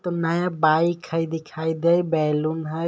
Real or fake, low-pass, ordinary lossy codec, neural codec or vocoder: real; none; none; none